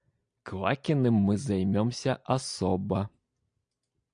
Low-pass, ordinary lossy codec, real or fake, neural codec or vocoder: 9.9 kHz; AAC, 64 kbps; real; none